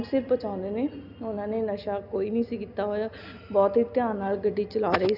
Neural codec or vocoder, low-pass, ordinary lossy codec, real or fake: none; 5.4 kHz; none; real